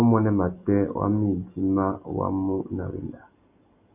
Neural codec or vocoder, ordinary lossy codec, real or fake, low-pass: none; AAC, 32 kbps; real; 3.6 kHz